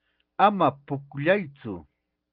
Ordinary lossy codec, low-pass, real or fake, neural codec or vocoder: Opus, 24 kbps; 5.4 kHz; real; none